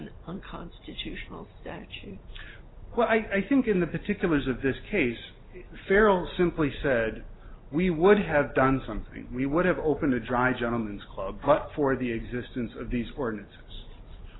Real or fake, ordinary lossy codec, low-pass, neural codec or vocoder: real; AAC, 16 kbps; 7.2 kHz; none